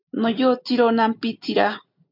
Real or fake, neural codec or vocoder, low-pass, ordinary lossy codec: real; none; 5.4 kHz; MP3, 48 kbps